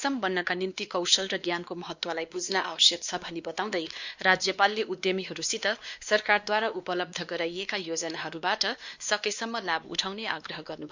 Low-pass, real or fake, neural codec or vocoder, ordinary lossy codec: 7.2 kHz; fake; codec, 16 kHz, 2 kbps, X-Codec, WavLM features, trained on Multilingual LibriSpeech; Opus, 64 kbps